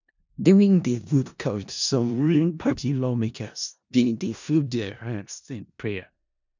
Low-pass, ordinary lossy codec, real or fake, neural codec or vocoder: 7.2 kHz; none; fake; codec, 16 kHz in and 24 kHz out, 0.4 kbps, LongCat-Audio-Codec, four codebook decoder